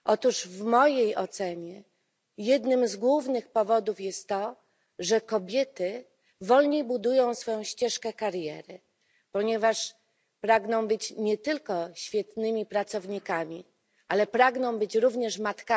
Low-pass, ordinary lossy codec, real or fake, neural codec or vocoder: none; none; real; none